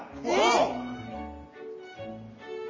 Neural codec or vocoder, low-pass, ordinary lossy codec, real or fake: none; 7.2 kHz; MP3, 32 kbps; real